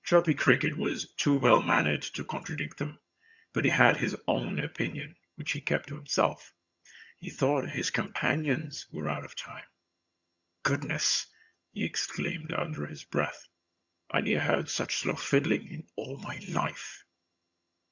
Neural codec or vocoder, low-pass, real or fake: vocoder, 22.05 kHz, 80 mel bands, HiFi-GAN; 7.2 kHz; fake